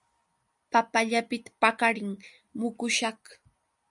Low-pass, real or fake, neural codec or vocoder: 10.8 kHz; real; none